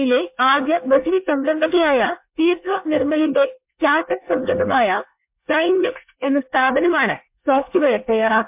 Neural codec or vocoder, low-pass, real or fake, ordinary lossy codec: codec, 24 kHz, 1 kbps, SNAC; 3.6 kHz; fake; MP3, 32 kbps